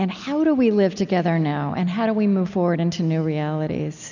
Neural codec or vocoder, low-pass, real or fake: none; 7.2 kHz; real